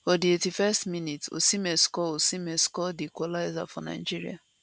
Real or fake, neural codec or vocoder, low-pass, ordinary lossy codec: real; none; none; none